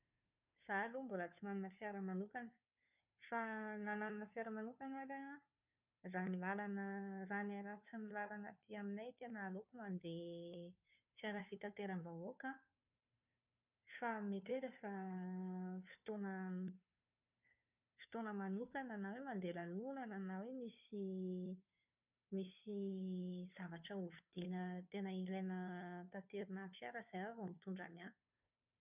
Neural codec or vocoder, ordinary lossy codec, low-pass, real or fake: codec, 16 kHz, 2 kbps, FunCodec, trained on Chinese and English, 25 frames a second; none; 3.6 kHz; fake